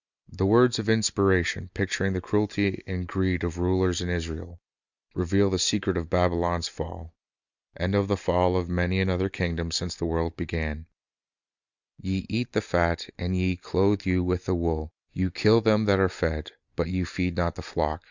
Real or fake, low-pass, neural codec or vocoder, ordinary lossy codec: real; 7.2 kHz; none; Opus, 64 kbps